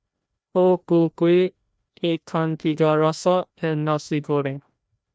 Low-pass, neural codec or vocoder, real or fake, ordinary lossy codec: none; codec, 16 kHz, 1 kbps, FreqCodec, larger model; fake; none